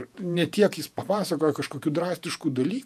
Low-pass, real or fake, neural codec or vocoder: 14.4 kHz; fake; vocoder, 48 kHz, 128 mel bands, Vocos